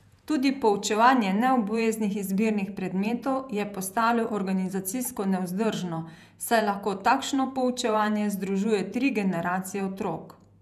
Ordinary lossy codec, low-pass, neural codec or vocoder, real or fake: none; 14.4 kHz; vocoder, 48 kHz, 128 mel bands, Vocos; fake